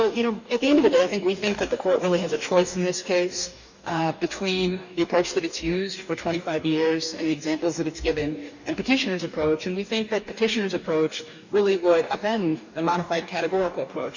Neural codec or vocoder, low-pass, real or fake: codec, 44.1 kHz, 2.6 kbps, DAC; 7.2 kHz; fake